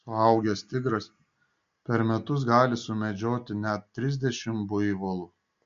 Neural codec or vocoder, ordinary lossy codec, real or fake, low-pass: none; MP3, 48 kbps; real; 7.2 kHz